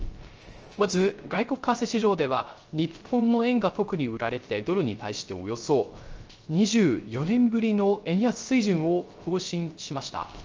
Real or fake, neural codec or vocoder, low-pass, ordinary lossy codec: fake; codec, 16 kHz, 0.3 kbps, FocalCodec; 7.2 kHz; Opus, 24 kbps